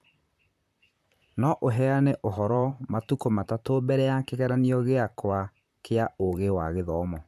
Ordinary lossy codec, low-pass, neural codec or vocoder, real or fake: MP3, 96 kbps; 14.4 kHz; vocoder, 44.1 kHz, 128 mel bands, Pupu-Vocoder; fake